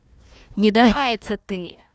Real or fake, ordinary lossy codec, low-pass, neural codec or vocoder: fake; none; none; codec, 16 kHz, 1 kbps, FunCodec, trained on Chinese and English, 50 frames a second